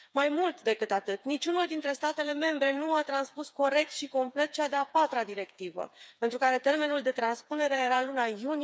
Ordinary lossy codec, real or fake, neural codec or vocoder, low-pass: none; fake; codec, 16 kHz, 4 kbps, FreqCodec, smaller model; none